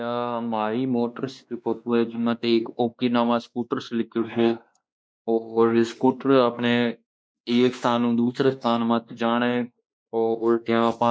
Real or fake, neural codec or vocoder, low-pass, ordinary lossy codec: fake; codec, 16 kHz, 2 kbps, X-Codec, WavLM features, trained on Multilingual LibriSpeech; none; none